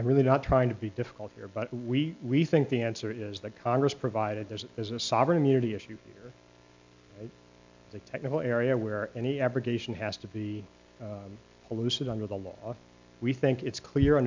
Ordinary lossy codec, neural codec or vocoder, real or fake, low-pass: MP3, 64 kbps; none; real; 7.2 kHz